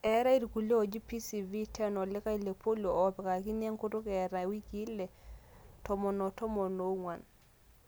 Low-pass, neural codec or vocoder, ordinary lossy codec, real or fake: none; none; none; real